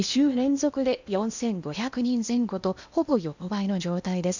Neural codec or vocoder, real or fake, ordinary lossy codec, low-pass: codec, 16 kHz in and 24 kHz out, 0.8 kbps, FocalCodec, streaming, 65536 codes; fake; none; 7.2 kHz